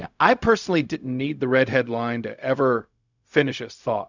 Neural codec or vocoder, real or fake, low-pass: codec, 16 kHz, 0.4 kbps, LongCat-Audio-Codec; fake; 7.2 kHz